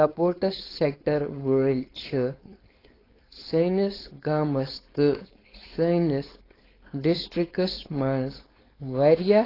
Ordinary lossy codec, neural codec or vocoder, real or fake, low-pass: AAC, 24 kbps; codec, 16 kHz, 4.8 kbps, FACodec; fake; 5.4 kHz